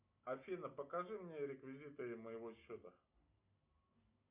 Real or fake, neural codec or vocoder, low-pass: real; none; 3.6 kHz